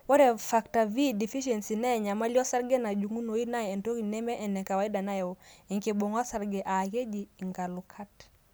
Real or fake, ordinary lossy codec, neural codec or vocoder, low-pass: real; none; none; none